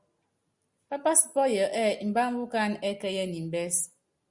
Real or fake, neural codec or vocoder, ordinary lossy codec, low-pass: real; none; Opus, 64 kbps; 10.8 kHz